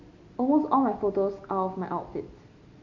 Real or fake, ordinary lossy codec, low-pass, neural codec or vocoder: real; MP3, 48 kbps; 7.2 kHz; none